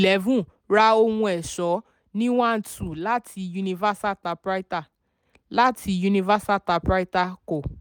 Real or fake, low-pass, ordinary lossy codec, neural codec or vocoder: real; none; none; none